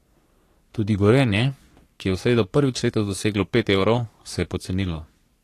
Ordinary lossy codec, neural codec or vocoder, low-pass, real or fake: AAC, 48 kbps; codec, 44.1 kHz, 3.4 kbps, Pupu-Codec; 14.4 kHz; fake